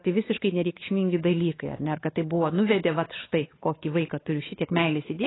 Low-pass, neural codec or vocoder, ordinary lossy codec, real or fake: 7.2 kHz; codec, 16 kHz, 4 kbps, X-Codec, WavLM features, trained on Multilingual LibriSpeech; AAC, 16 kbps; fake